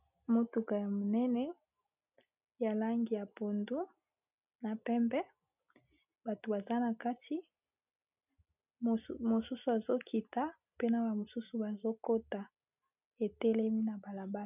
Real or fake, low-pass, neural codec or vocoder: real; 3.6 kHz; none